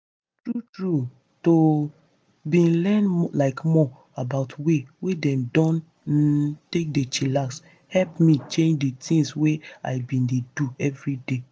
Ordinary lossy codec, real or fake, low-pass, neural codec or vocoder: none; real; none; none